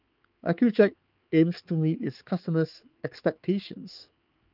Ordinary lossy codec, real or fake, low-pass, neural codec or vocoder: Opus, 24 kbps; fake; 5.4 kHz; codec, 16 kHz, 4 kbps, X-Codec, HuBERT features, trained on balanced general audio